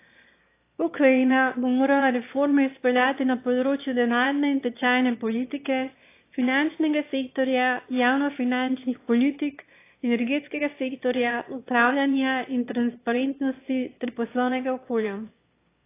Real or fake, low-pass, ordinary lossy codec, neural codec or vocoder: fake; 3.6 kHz; AAC, 24 kbps; autoencoder, 22.05 kHz, a latent of 192 numbers a frame, VITS, trained on one speaker